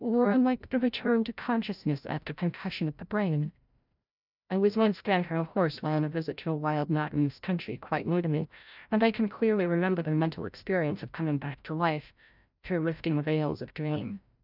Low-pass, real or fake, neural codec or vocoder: 5.4 kHz; fake; codec, 16 kHz, 0.5 kbps, FreqCodec, larger model